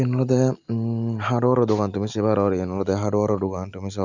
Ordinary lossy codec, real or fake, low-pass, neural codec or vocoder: none; real; 7.2 kHz; none